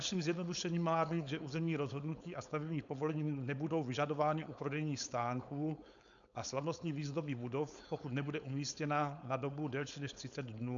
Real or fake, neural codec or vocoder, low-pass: fake; codec, 16 kHz, 4.8 kbps, FACodec; 7.2 kHz